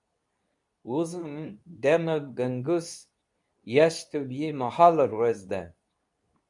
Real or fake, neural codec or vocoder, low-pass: fake; codec, 24 kHz, 0.9 kbps, WavTokenizer, medium speech release version 2; 10.8 kHz